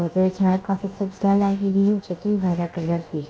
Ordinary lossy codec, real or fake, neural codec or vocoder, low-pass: none; fake; codec, 16 kHz, about 1 kbps, DyCAST, with the encoder's durations; none